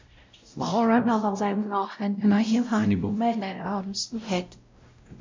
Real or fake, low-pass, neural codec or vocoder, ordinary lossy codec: fake; 7.2 kHz; codec, 16 kHz, 0.5 kbps, X-Codec, WavLM features, trained on Multilingual LibriSpeech; MP3, 64 kbps